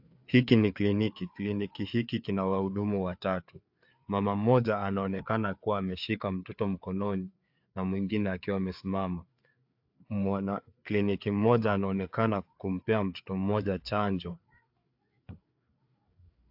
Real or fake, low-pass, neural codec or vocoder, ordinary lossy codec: fake; 5.4 kHz; codec, 16 kHz, 4 kbps, FreqCodec, larger model; AAC, 48 kbps